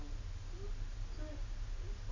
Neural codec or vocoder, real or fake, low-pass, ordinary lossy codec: none; real; 7.2 kHz; none